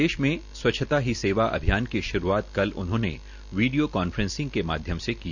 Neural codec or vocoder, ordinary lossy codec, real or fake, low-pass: none; none; real; 7.2 kHz